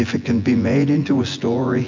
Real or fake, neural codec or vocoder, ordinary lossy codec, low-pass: fake; vocoder, 24 kHz, 100 mel bands, Vocos; MP3, 64 kbps; 7.2 kHz